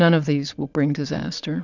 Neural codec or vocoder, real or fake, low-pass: vocoder, 22.05 kHz, 80 mel bands, Vocos; fake; 7.2 kHz